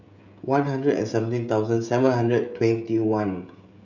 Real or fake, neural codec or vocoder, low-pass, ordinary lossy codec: fake; codec, 16 kHz, 16 kbps, FreqCodec, smaller model; 7.2 kHz; none